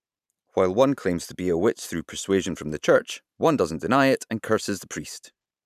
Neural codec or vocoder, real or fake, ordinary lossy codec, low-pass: vocoder, 44.1 kHz, 128 mel bands every 512 samples, BigVGAN v2; fake; none; 14.4 kHz